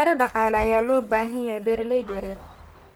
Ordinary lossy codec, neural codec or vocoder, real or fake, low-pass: none; codec, 44.1 kHz, 1.7 kbps, Pupu-Codec; fake; none